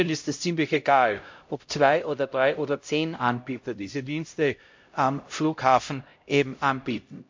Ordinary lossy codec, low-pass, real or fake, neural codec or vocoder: MP3, 48 kbps; 7.2 kHz; fake; codec, 16 kHz, 0.5 kbps, X-Codec, HuBERT features, trained on LibriSpeech